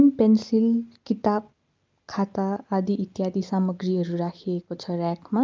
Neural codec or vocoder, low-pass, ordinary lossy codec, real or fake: none; 7.2 kHz; Opus, 24 kbps; real